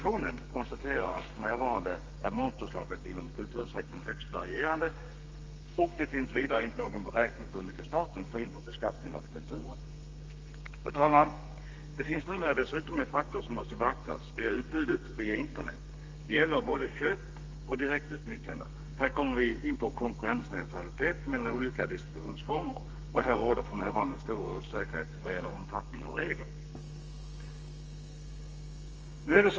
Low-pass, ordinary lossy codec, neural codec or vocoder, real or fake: 7.2 kHz; Opus, 24 kbps; codec, 32 kHz, 1.9 kbps, SNAC; fake